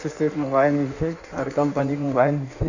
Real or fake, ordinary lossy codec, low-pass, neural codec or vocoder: fake; none; 7.2 kHz; codec, 16 kHz in and 24 kHz out, 1.1 kbps, FireRedTTS-2 codec